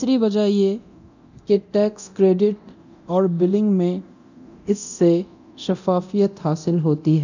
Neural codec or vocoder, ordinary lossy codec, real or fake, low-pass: codec, 24 kHz, 0.9 kbps, DualCodec; none; fake; 7.2 kHz